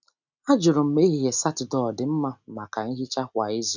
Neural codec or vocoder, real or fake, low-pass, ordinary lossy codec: none; real; 7.2 kHz; none